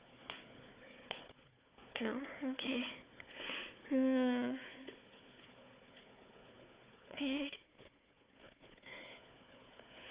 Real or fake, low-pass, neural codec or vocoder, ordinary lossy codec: fake; 3.6 kHz; codec, 16 kHz, 4 kbps, FunCodec, trained on Chinese and English, 50 frames a second; Opus, 64 kbps